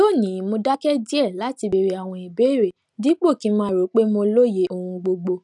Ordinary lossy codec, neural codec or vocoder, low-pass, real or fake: none; none; 10.8 kHz; real